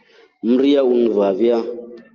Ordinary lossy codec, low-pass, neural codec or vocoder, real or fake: Opus, 32 kbps; 7.2 kHz; none; real